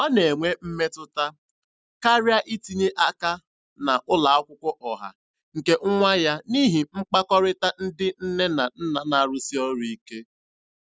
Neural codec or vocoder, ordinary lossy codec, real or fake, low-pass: none; none; real; none